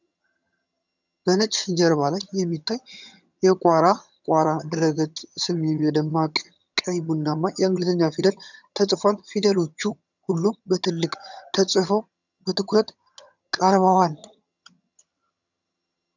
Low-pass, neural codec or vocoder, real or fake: 7.2 kHz; vocoder, 22.05 kHz, 80 mel bands, HiFi-GAN; fake